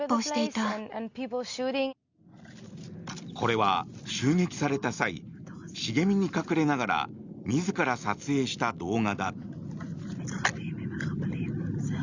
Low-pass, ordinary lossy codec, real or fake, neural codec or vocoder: 7.2 kHz; Opus, 64 kbps; real; none